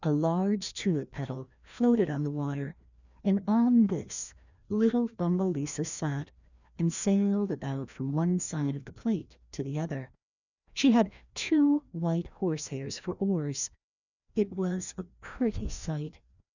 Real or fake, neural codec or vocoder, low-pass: fake; codec, 16 kHz, 1 kbps, FreqCodec, larger model; 7.2 kHz